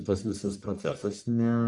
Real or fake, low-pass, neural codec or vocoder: fake; 10.8 kHz; codec, 44.1 kHz, 1.7 kbps, Pupu-Codec